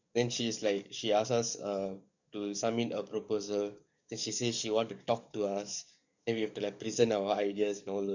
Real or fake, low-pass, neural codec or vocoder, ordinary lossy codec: fake; 7.2 kHz; codec, 44.1 kHz, 7.8 kbps, DAC; none